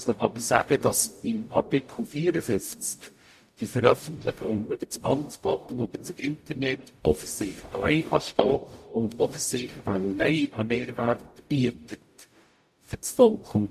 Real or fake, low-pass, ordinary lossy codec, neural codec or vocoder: fake; 14.4 kHz; MP3, 64 kbps; codec, 44.1 kHz, 0.9 kbps, DAC